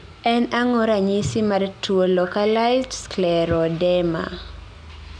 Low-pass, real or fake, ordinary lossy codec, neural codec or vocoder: 9.9 kHz; real; none; none